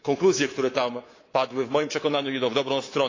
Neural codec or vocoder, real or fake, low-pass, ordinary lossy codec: codec, 24 kHz, 3.1 kbps, DualCodec; fake; 7.2 kHz; AAC, 32 kbps